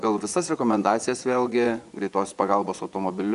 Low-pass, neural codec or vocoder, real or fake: 10.8 kHz; vocoder, 24 kHz, 100 mel bands, Vocos; fake